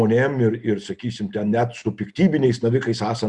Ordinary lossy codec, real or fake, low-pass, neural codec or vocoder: Opus, 64 kbps; real; 10.8 kHz; none